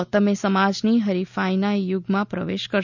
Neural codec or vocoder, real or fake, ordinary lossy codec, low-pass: none; real; none; 7.2 kHz